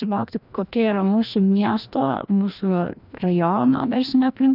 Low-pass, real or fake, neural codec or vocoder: 5.4 kHz; fake; codec, 16 kHz, 1 kbps, FreqCodec, larger model